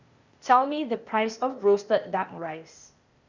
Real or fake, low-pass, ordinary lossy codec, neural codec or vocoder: fake; 7.2 kHz; Opus, 64 kbps; codec, 16 kHz, 0.8 kbps, ZipCodec